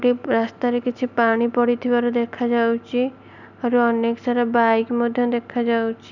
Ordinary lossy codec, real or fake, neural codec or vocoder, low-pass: none; real; none; 7.2 kHz